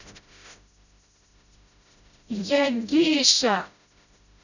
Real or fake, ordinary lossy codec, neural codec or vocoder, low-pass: fake; none; codec, 16 kHz, 0.5 kbps, FreqCodec, smaller model; 7.2 kHz